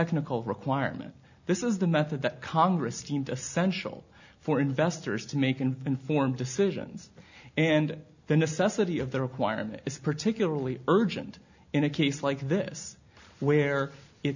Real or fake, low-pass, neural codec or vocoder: real; 7.2 kHz; none